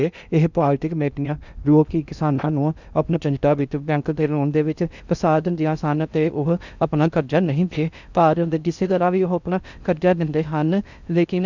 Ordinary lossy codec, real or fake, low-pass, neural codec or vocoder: none; fake; 7.2 kHz; codec, 16 kHz in and 24 kHz out, 0.6 kbps, FocalCodec, streaming, 2048 codes